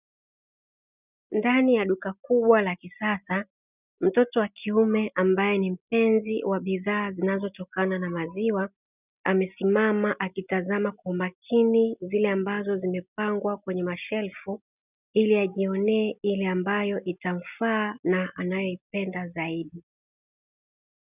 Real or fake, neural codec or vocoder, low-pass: real; none; 3.6 kHz